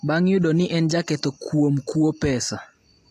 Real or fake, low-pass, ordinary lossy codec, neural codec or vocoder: real; 14.4 kHz; AAC, 64 kbps; none